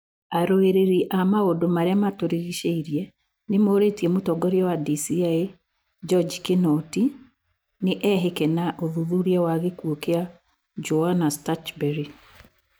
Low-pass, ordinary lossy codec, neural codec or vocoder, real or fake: none; none; none; real